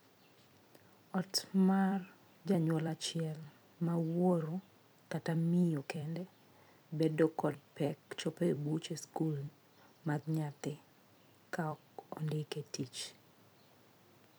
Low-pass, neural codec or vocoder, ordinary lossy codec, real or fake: none; vocoder, 44.1 kHz, 128 mel bands every 256 samples, BigVGAN v2; none; fake